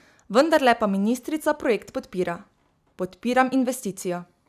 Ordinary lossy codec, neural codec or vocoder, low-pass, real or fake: none; none; 14.4 kHz; real